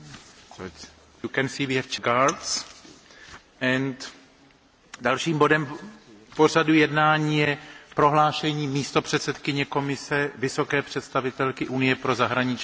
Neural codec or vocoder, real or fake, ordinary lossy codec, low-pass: none; real; none; none